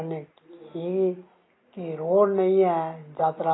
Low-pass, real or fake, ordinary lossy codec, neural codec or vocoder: 7.2 kHz; real; AAC, 16 kbps; none